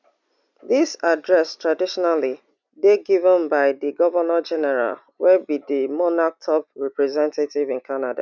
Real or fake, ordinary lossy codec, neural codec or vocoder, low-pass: real; none; none; 7.2 kHz